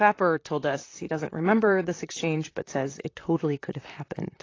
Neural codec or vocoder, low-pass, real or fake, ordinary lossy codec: vocoder, 44.1 kHz, 128 mel bands, Pupu-Vocoder; 7.2 kHz; fake; AAC, 32 kbps